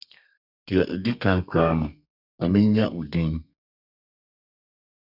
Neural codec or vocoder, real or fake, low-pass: codec, 32 kHz, 1.9 kbps, SNAC; fake; 5.4 kHz